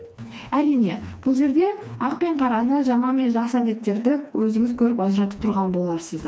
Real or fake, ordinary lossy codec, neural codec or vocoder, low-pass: fake; none; codec, 16 kHz, 2 kbps, FreqCodec, smaller model; none